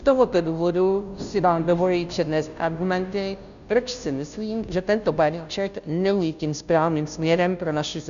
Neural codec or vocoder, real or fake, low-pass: codec, 16 kHz, 0.5 kbps, FunCodec, trained on Chinese and English, 25 frames a second; fake; 7.2 kHz